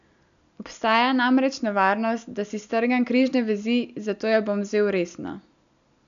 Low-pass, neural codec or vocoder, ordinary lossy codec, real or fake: 7.2 kHz; none; none; real